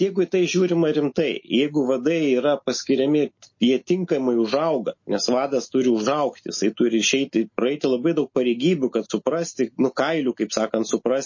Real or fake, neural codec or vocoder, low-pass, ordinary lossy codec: real; none; 7.2 kHz; MP3, 32 kbps